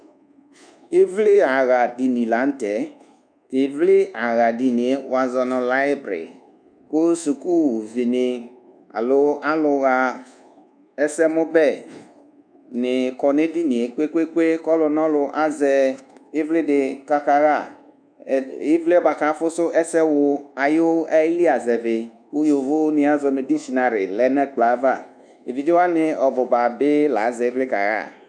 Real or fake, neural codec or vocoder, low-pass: fake; codec, 24 kHz, 1.2 kbps, DualCodec; 9.9 kHz